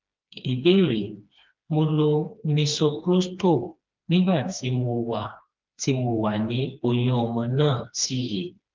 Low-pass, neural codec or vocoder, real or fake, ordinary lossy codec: 7.2 kHz; codec, 16 kHz, 2 kbps, FreqCodec, smaller model; fake; Opus, 24 kbps